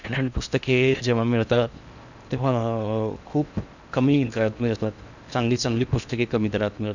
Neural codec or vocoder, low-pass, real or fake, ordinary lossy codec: codec, 16 kHz in and 24 kHz out, 0.8 kbps, FocalCodec, streaming, 65536 codes; 7.2 kHz; fake; none